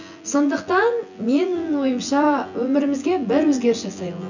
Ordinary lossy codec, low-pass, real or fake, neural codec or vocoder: none; 7.2 kHz; fake; vocoder, 24 kHz, 100 mel bands, Vocos